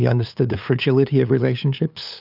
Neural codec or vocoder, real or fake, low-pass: codec, 16 kHz, 8 kbps, FunCodec, trained on LibriTTS, 25 frames a second; fake; 5.4 kHz